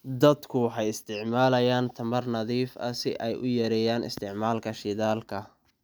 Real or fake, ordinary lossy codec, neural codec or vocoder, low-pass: real; none; none; none